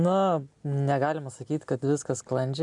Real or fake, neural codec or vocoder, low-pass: real; none; 10.8 kHz